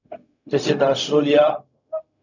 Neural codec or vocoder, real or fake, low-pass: codec, 16 kHz, 0.4 kbps, LongCat-Audio-Codec; fake; 7.2 kHz